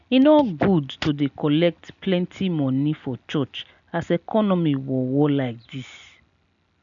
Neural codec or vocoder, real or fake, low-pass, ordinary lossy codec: none; real; 7.2 kHz; none